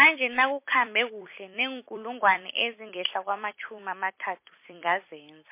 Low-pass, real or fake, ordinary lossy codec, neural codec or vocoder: 3.6 kHz; fake; MP3, 24 kbps; vocoder, 44.1 kHz, 128 mel bands every 256 samples, BigVGAN v2